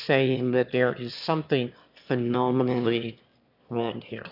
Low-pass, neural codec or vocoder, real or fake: 5.4 kHz; autoencoder, 22.05 kHz, a latent of 192 numbers a frame, VITS, trained on one speaker; fake